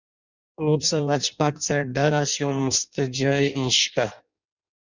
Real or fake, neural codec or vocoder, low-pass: fake; codec, 16 kHz in and 24 kHz out, 0.6 kbps, FireRedTTS-2 codec; 7.2 kHz